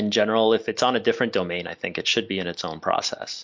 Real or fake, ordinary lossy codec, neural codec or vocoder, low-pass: real; MP3, 64 kbps; none; 7.2 kHz